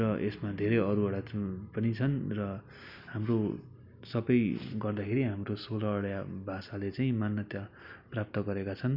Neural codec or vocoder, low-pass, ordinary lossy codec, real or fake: none; 5.4 kHz; none; real